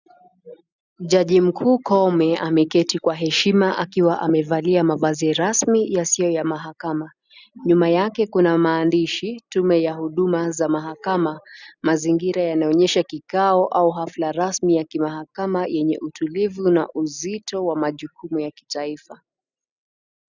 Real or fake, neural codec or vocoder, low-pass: real; none; 7.2 kHz